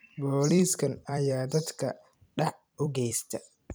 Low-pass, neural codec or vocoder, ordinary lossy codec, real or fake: none; vocoder, 44.1 kHz, 128 mel bands every 256 samples, BigVGAN v2; none; fake